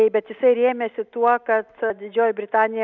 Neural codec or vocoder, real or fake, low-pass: none; real; 7.2 kHz